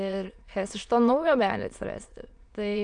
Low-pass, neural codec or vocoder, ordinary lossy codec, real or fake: 9.9 kHz; autoencoder, 22.05 kHz, a latent of 192 numbers a frame, VITS, trained on many speakers; AAC, 64 kbps; fake